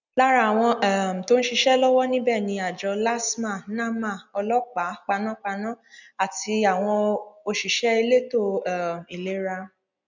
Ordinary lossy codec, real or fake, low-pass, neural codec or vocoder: none; real; 7.2 kHz; none